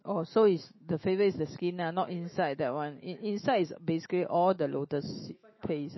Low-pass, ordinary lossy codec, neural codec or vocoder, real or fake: 7.2 kHz; MP3, 24 kbps; autoencoder, 48 kHz, 128 numbers a frame, DAC-VAE, trained on Japanese speech; fake